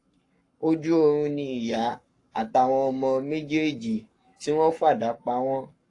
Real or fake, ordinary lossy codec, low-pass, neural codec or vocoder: fake; AAC, 48 kbps; 10.8 kHz; codec, 44.1 kHz, 7.8 kbps, Pupu-Codec